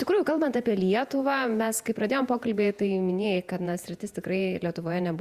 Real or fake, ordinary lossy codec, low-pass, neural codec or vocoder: fake; Opus, 64 kbps; 14.4 kHz; vocoder, 48 kHz, 128 mel bands, Vocos